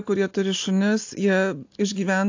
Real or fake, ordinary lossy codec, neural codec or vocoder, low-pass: real; AAC, 48 kbps; none; 7.2 kHz